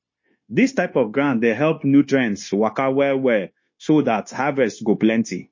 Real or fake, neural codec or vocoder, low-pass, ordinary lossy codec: fake; codec, 16 kHz, 0.9 kbps, LongCat-Audio-Codec; 7.2 kHz; MP3, 32 kbps